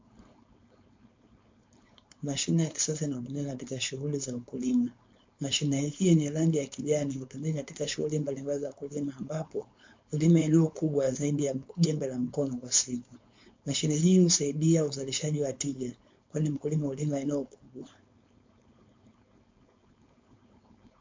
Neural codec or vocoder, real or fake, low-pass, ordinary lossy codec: codec, 16 kHz, 4.8 kbps, FACodec; fake; 7.2 kHz; MP3, 48 kbps